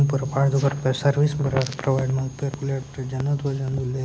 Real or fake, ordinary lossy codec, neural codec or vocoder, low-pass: real; none; none; none